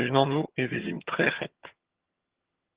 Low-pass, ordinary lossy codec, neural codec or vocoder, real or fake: 3.6 kHz; Opus, 16 kbps; vocoder, 22.05 kHz, 80 mel bands, HiFi-GAN; fake